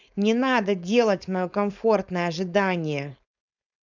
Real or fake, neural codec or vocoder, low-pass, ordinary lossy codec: fake; codec, 16 kHz, 4.8 kbps, FACodec; 7.2 kHz; none